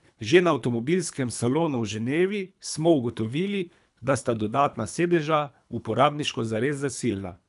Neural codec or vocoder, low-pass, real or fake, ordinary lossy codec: codec, 24 kHz, 3 kbps, HILCodec; 10.8 kHz; fake; none